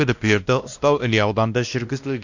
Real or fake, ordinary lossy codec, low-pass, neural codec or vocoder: fake; none; 7.2 kHz; codec, 16 kHz, 1 kbps, X-Codec, WavLM features, trained on Multilingual LibriSpeech